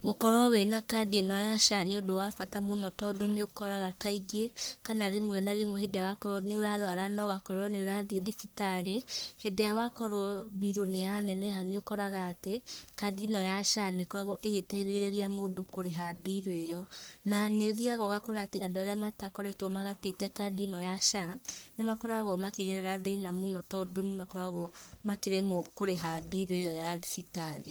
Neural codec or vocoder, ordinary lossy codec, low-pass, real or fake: codec, 44.1 kHz, 1.7 kbps, Pupu-Codec; none; none; fake